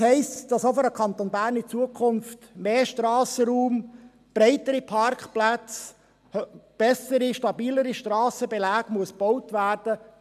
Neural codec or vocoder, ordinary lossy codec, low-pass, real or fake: none; MP3, 96 kbps; 14.4 kHz; real